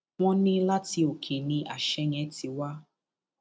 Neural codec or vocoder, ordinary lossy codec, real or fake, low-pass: none; none; real; none